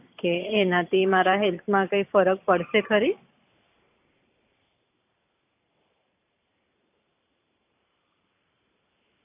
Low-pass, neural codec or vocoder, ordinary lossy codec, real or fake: 3.6 kHz; vocoder, 44.1 kHz, 128 mel bands every 512 samples, BigVGAN v2; AAC, 32 kbps; fake